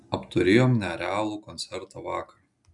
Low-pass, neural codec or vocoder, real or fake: 10.8 kHz; none; real